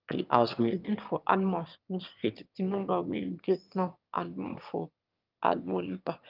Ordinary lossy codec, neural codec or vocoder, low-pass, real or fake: Opus, 24 kbps; autoencoder, 22.05 kHz, a latent of 192 numbers a frame, VITS, trained on one speaker; 5.4 kHz; fake